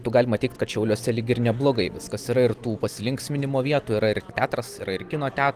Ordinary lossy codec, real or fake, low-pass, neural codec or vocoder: Opus, 24 kbps; real; 14.4 kHz; none